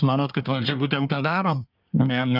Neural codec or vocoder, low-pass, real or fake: codec, 24 kHz, 1 kbps, SNAC; 5.4 kHz; fake